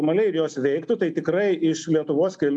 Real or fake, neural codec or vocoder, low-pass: real; none; 9.9 kHz